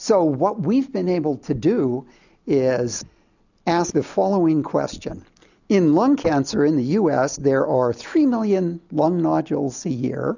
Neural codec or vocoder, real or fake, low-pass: none; real; 7.2 kHz